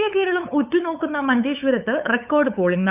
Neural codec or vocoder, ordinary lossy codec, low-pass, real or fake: codec, 16 kHz, 8 kbps, FunCodec, trained on LibriTTS, 25 frames a second; none; 3.6 kHz; fake